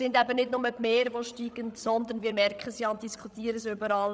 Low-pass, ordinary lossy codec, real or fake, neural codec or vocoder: none; none; fake; codec, 16 kHz, 16 kbps, FreqCodec, larger model